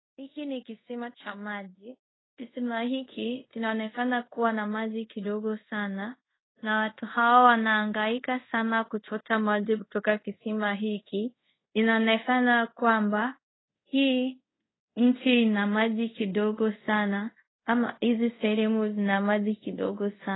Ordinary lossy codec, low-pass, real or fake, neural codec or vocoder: AAC, 16 kbps; 7.2 kHz; fake; codec, 24 kHz, 0.5 kbps, DualCodec